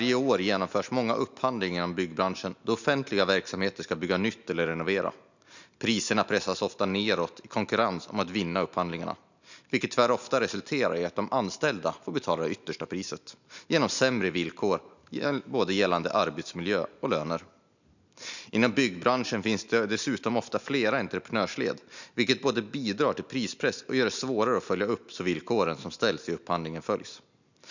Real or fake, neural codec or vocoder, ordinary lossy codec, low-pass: real; none; none; 7.2 kHz